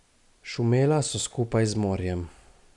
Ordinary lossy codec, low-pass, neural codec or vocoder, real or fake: none; 10.8 kHz; none; real